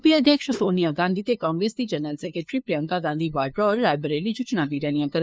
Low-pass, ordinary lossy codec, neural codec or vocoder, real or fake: none; none; codec, 16 kHz, 2 kbps, FunCodec, trained on LibriTTS, 25 frames a second; fake